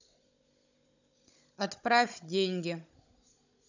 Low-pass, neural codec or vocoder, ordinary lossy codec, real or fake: 7.2 kHz; codec, 16 kHz, 16 kbps, FunCodec, trained on Chinese and English, 50 frames a second; none; fake